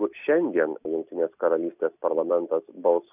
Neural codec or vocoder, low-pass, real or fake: none; 3.6 kHz; real